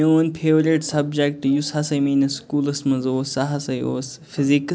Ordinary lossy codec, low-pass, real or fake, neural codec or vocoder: none; none; real; none